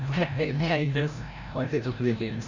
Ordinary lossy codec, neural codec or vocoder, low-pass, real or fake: none; codec, 16 kHz, 0.5 kbps, FreqCodec, larger model; 7.2 kHz; fake